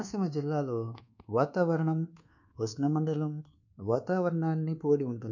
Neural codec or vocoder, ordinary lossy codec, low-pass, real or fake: codec, 24 kHz, 1.2 kbps, DualCodec; none; 7.2 kHz; fake